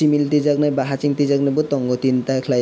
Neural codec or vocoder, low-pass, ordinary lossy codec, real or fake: none; none; none; real